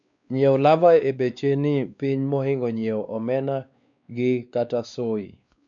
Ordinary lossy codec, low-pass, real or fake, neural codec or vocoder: none; 7.2 kHz; fake; codec, 16 kHz, 2 kbps, X-Codec, WavLM features, trained on Multilingual LibriSpeech